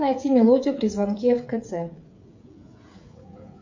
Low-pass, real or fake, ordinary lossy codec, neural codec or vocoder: 7.2 kHz; fake; MP3, 48 kbps; codec, 16 kHz, 16 kbps, FreqCodec, smaller model